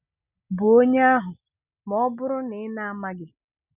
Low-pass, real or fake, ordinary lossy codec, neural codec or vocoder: 3.6 kHz; real; none; none